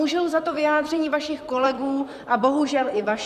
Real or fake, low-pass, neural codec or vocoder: fake; 14.4 kHz; vocoder, 44.1 kHz, 128 mel bands, Pupu-Vocoder